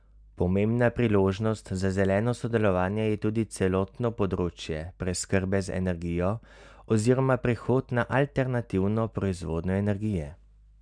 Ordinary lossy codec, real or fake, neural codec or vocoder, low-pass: none; real; none; 9.9 kHz